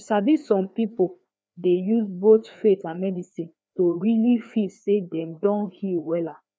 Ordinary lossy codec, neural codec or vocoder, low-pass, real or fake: none; codec, 16 kHz, 2 kbps, FreqCodec, larger model; none; fake